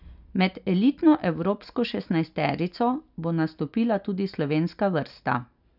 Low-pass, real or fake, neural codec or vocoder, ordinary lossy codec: 5.4 kHz; real; none; none